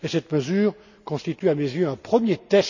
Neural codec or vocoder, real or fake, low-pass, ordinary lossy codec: none; real; 7.2 kHz; none